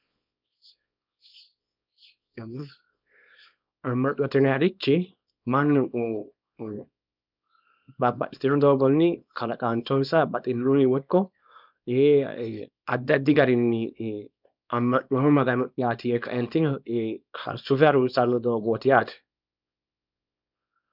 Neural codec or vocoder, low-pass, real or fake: codec, 24 kHz, 0.9 kbps, WavTokenizer, small release; 5.4 kHz; fake